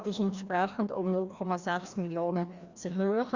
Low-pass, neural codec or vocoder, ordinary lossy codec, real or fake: 7.2 kHz; codec, 16 kHz, 1 kbps, FreqCodec, larger model; Opus, 64 kbps; fake